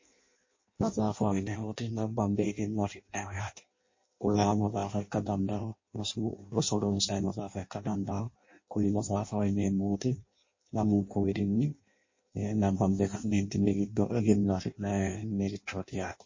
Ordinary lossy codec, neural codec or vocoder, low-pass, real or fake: MP3, 32 kbps; codec, 16 kHz in and 24 kHz out, 0.6 kbps, FireRedTTS-2 codec; 7.2 kHz; fake